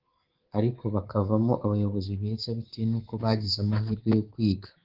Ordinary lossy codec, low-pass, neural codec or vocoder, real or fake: Opus, 16 kbps; 5.4 kHz; codec, 24 kHz, 3.1 kbps, DualCodec; fake